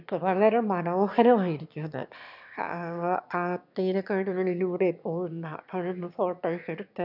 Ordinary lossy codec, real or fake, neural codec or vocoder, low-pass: none; fake; autoencoder, 22.05 kHz, a latent of 192 numbers a frame, VITS, trained on one speaker; 5.4 kHz